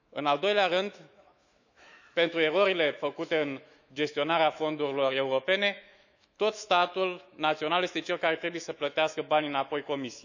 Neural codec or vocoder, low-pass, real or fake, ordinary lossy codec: autoencoder, 48 kHz, 128 numbers a frame, DAC-VAE, trained on Japanese speech; 7.2 kHz; fake; none